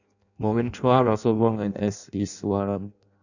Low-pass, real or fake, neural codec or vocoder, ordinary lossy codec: 7.2 kHz; fake; codec, 16 kHz in and 24 kHz out, 0.6 kbps, FireRedTTS-2 codec; none